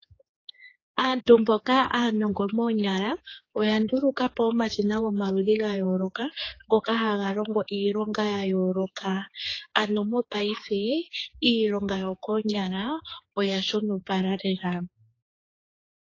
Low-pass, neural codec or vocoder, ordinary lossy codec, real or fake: 7.2 kHz; codec, 16 kHz, 4 kbps, X-Codec, HuBERT features, trained on general audio; AAC, 32 kbps; fake